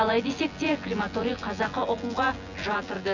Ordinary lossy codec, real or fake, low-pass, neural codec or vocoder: none; fake; 7.2 kHz; vocoder, 24 kHz, 100 mel bands, Vocos